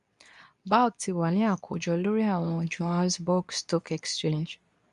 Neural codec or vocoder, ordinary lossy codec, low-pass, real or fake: codec, 24 kHz, 0.9 kbps, WavTokenizer, medium speech release version 2; none; 10.8 kHz; fake